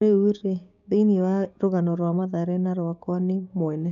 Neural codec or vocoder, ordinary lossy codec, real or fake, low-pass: codec, 16 kHz, 6 kbps, DAC; none; fake; 7.2 kHz